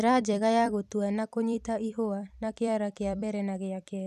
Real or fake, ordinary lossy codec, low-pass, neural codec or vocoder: fake; none; 14.4 kHz; vocoder, 44.1 kHz, 128 mel bands every 256 samples, BigVGAN v2